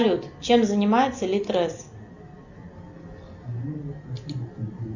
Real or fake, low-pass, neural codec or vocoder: real; 7.2 kHz; none